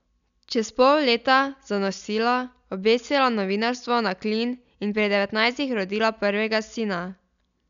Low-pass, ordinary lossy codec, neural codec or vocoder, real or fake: 7.2 kHz; none; none; real